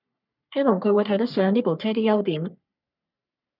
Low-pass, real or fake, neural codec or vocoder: 5.4 kHz; fake; codec, 44.1 kHz, 3.4 kbps, Pupu-Codec